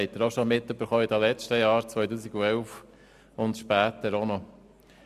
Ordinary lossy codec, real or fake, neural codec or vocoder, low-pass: MP3, 96 kbps; real; none; 14.4 kHz